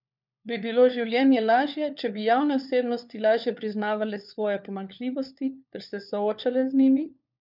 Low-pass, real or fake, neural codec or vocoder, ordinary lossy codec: 5.4 kHz; fake; codec, 16 kHz, 4 kbps, FunCodec, trained on LibriTTS, 50 frames a second; none